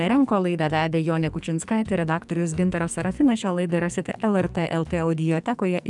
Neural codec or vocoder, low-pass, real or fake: codec, 44.1 kHz, 2.6 kbps, SNAC; 10.8 kHz; fake